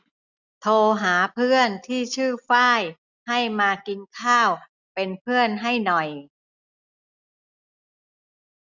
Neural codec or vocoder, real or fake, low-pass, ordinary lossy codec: none; real; 7.2 kHz; none